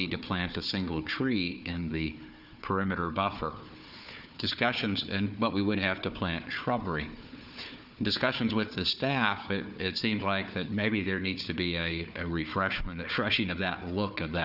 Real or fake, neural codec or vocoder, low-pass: fake; codec, 16 kHz, 4 kbps, FunCodec, trained on Chinese and English, 50 frames a second; 5.4 kHz